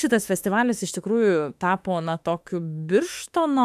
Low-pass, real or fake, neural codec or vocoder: 14.4 kHz; fake; autoencoder, 48 kHz, 32 numbers a frame, DAC-VAE, trained on Japanese speech